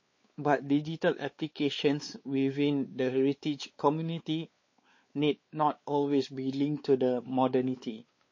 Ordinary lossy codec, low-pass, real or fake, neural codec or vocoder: MP3, 32 kbps; 7.2 kHz; fake; codec, 16 kHz, 4 kbps, X-Codec, WavLM features, trained on Multilingual LibriSpeech